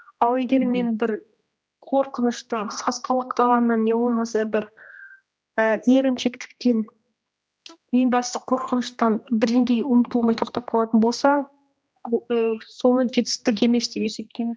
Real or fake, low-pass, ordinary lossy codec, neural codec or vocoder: fake; none; none; codec, 16 kHz, 1 kbps, X-Codec, HuBERT features, trained on general audio